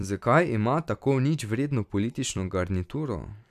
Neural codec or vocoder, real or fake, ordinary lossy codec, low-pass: vocoder, 44.1 kHz, 128 mel bands every 256 samples, BigVGAN v2; fake; none; 14.4 kHz